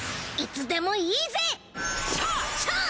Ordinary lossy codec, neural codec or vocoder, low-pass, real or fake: none; none; none; real